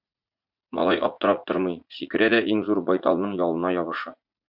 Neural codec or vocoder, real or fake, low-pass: vocoder, 44.1 kHz, 80 mel bands, Vocos; fake; 5.4 kHz